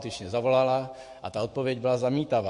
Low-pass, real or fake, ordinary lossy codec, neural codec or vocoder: 14.4 kHz; fake; MP3, 48 kbps; autoencoder, 48 kHz, 128 numbers a frame, DAC-VAE, trained on Japanese speech